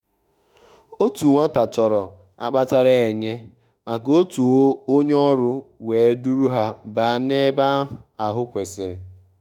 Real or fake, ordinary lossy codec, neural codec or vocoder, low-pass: fake; none; autoencoder, 48 kHz, 32 numbers a frame, DAC-VAE, trained on Japanese speech; 19.8 kHz